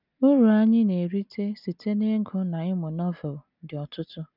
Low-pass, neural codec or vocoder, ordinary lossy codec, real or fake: 5.4 kHz; none; none; real